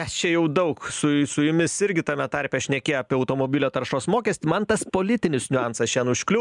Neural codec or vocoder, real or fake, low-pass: none; real; 10.8 kHz